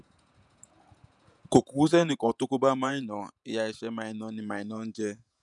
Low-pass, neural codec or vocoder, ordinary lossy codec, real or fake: 10.8 kHz; vocoder, 48 kHz, 128 mel bands, Vocos; none; fake